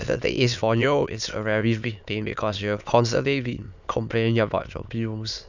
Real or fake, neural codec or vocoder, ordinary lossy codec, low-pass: fake; autoencoder, 22.05 kHz, a latent of 192 numbers a frame, VITS, trained on many speakers; none; 7.2 kHz